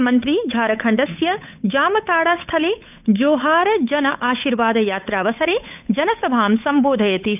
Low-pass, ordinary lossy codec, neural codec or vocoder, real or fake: 3.6 kHz; none; codec, 16 kHz, 8 kbps, FunCodec, trained on Chinese and English, 25 frames a second; fake